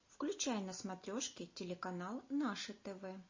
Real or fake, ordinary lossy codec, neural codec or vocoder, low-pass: real; MP3, 32 kbps; none; 7.2 kHz